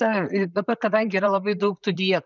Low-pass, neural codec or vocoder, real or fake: 7.2 kHz; vocoder, 44.1 kHz, 128 mel bands, Pupu-Vocoder; fake